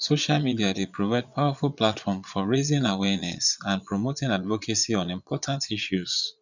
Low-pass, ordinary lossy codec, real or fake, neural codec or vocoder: 7.2 kHz; none; fake; vocoder, 22.05 kHz, 80 mel bands, Vocos